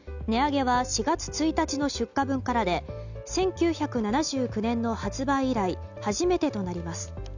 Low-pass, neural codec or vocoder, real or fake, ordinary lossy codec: 7.2 kHz; none; real; none